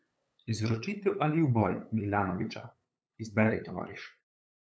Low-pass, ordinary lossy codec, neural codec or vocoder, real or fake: none; none; codec, 16 kHz, 8 kbps, FunCodec, trained on LibriTTS, 25 frames a second; fake